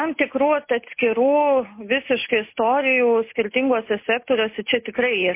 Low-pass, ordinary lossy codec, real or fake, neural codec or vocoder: 3.6 kHz; MP3, 24 kbps; real; none